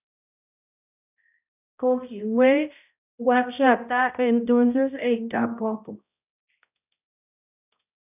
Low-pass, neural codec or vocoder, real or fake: 3.6 kHz; codec, 16 kHz, 0.5 kbps, X-Codec, HuBERT features, trained on balanced general audio; fake